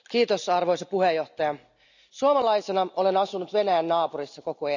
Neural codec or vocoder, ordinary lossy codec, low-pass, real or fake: none; none; 7.2 kHz; real